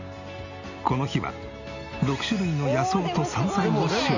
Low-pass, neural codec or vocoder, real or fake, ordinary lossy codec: 7.2 kHz; none; real; none